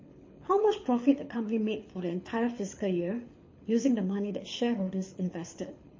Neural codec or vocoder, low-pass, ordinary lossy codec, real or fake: codec, 24 kHz, 6 kbps, HILCodec; 7.2 kHz; MP3, 32 kbps; fake